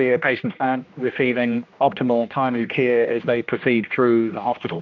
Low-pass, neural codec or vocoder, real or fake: 7.2 kHz; codec, 16 kHz, 1 kbps, X-Codec, HuBERT features, trained on general audio; fake